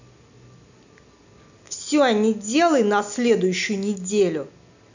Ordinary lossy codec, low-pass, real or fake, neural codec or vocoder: none; 7.2 kHz; real; none